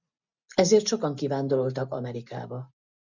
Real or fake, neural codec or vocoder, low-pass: real; none; 7.2 kHz